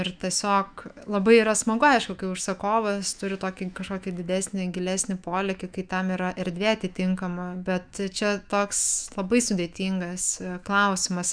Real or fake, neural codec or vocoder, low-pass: fake; autoencoder, 48 kHz, 128 numbers a frame, DAC-VAE, trained on Japanese speech; 9.9 kHz